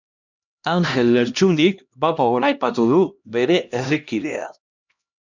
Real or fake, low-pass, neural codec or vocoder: fake; 7.2 kHz; codec, 16 kHz, 1 kbps, X-Codec, HuBERT features, trained on LibriSpeech